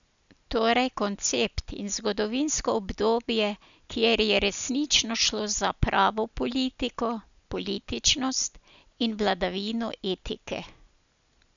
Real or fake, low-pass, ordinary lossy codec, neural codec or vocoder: real; 7.2 kHz; none; none